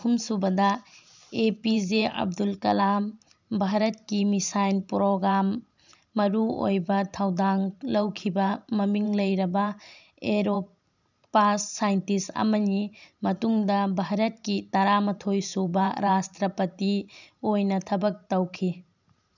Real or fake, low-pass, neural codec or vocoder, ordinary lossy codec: fake; 7.2 kHz; vocoder, 44.1 kHz, 128 mel bands every 512 samples, BigVGAN v2; none